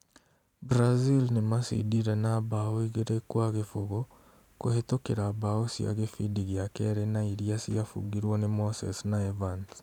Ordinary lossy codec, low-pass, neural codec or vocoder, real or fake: none; 19.8 kHz; vocoder, 44.1 kHz, 128 mel bands every 512 samples, BigVGAN v2; fake